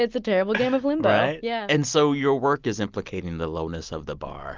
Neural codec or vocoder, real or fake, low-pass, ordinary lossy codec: none; real; 7.2 kHz; Opus, 24 kbps